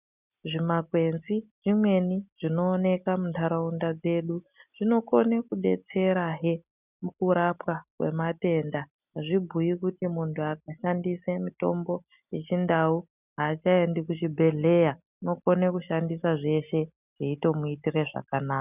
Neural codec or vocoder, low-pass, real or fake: none; 3.6 kHz; real